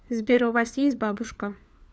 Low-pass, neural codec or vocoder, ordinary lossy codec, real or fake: none; codec, 16 kHz, 4 kbps, FunCodec, trained on LibriTTS, 50 frames a second; none; fake